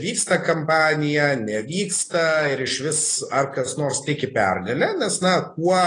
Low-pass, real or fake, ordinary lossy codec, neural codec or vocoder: 9.9 kHz; real; AAC, 32 kbps; none